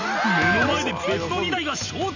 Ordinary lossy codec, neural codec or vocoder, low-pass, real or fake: none; none; 7.2 kHz; real